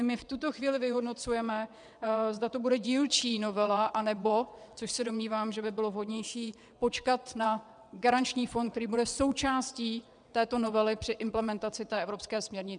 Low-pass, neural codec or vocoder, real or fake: 9.9 kHz; vocoder, 22.05 kHz, 80 mel bands, WaveNeXt; fake